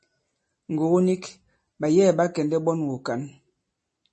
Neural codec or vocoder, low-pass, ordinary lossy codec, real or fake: none; 10.8 kHz; MP3, 32 kbps; real